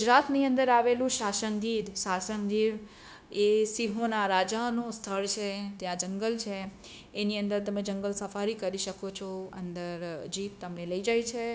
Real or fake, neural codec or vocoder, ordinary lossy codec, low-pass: fake; codec, 16 kHz, 0.9 kbps, LongCat-Audio-Codec; none; none